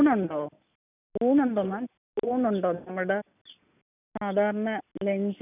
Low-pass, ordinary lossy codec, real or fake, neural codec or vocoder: 3.6 kHz; none; real; none